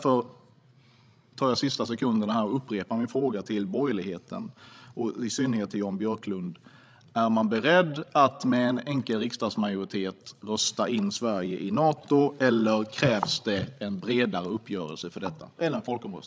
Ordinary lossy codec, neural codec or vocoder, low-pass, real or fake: none; codec, 16 kHz, 16 kbps, FreqCodec, larger model; none; fake